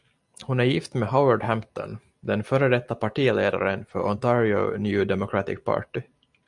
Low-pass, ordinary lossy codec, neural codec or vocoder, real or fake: 10.8 kHz; MP3, 96 kbps; none; real